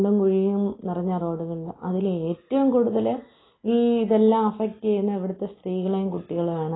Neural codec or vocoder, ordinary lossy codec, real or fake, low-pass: none; AAC, 16 kbps; real; 7.2 kHz